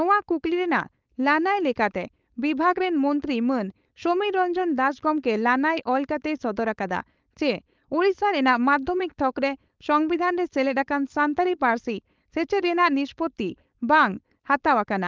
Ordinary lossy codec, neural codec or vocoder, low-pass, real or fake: Opus, 32 kbps; codec, 16 kHz, 4.8 kbps, FACodec; 7.2 kHz; fake